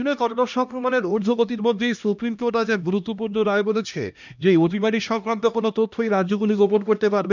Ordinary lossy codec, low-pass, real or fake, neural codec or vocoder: none; 7.2 kHz; fake; codec, 16 kHz, 1 kbps, X-Codec, HuBERT features, trained on LibriSpeech